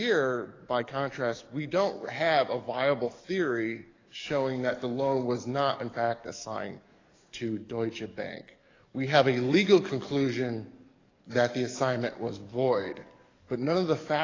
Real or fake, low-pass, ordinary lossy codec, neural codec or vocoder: fake; 7.2 kHz; AAC, 32 kbps; codec, 44.1 kHz, 7.8 kbps, DAC